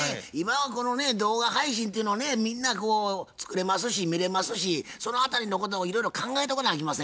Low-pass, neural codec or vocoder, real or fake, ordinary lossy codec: none; none; real; none